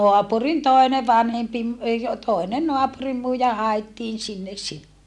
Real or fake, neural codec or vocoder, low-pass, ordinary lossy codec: real; none; none; none